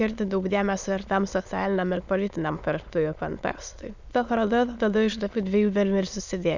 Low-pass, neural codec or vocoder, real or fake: 7.2 kHz; autoencoder, 22.05 kHz, a latent of 192 numbers a frame, VITS, trained on many speakers; fake